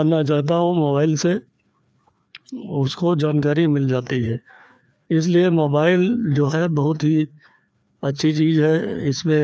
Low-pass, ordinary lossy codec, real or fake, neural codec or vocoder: none; none; fake; codec, 16 kHz, 2 kbps, FreqCodec, larger model